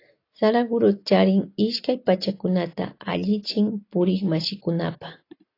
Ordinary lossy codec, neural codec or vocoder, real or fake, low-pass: AAC, 32 kbps; none; real; 5.4 kHz